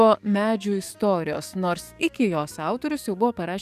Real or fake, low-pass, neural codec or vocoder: fake; 14.4 kHz; codec, 44.1 kHz, 7.8 kbps, DAC